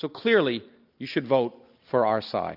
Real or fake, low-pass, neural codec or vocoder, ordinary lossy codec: real; 5.4 kHz; none; AAC, 48 kbps